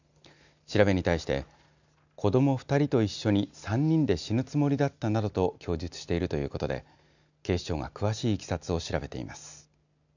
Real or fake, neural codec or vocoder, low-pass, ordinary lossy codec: real; none; 7.2 kHz; none